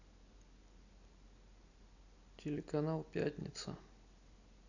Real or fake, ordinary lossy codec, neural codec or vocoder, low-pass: real; none; none; 7.2 kHz